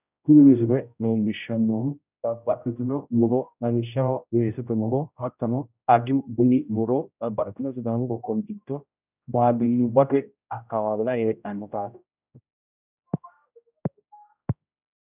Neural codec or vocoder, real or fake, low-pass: codec, 16 kHz, 0.5 kbps, X-Codec, HuBERT features, trained on general audio; fake; 3.6 kHz